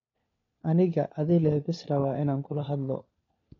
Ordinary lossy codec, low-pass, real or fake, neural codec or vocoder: AAC, 32 kbps; 7.2 kHz; fake; codec, 16 kHz, 4 kbps, FunCodec, trained on LibriTTS, 50 frames a second